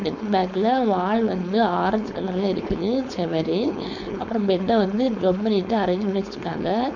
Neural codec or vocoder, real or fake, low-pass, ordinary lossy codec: codec, 16 kHz, 4.8 kbps, FACodec; fake; 7.2 kHz; none